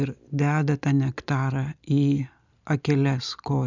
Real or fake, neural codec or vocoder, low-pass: real; none; 7.2 kHz